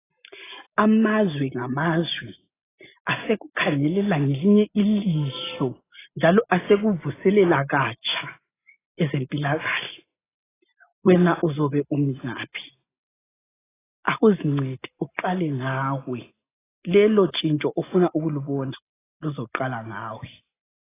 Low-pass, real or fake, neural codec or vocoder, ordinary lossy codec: 3.6 kHz; real; none; AAC, 16 kbps